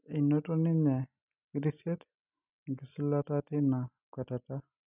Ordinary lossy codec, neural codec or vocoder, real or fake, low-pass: none; none; real; 3.6 kHz